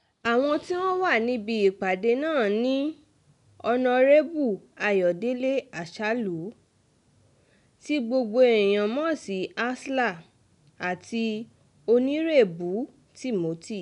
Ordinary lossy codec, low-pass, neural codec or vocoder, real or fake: none; 10.8 kHz; none; real